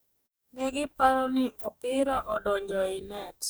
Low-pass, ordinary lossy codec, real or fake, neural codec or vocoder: none; none; fake; codec, 44.1 kHz, 2.6 kbps, DAC